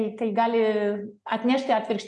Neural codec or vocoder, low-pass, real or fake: none; 10.8 kHz; real